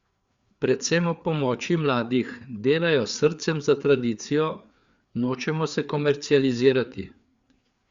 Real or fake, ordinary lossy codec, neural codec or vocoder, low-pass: fake; Opus, 64 kbps; codec, 16 kHz, 4 kbps, FreqCodec, larger model; 7.2 kHz